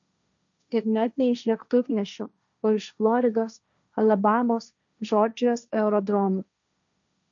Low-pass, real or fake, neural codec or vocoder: 7.2 kHz; fake; codec, 16 kHz, 1.1 kbps, Voila-Tokenizer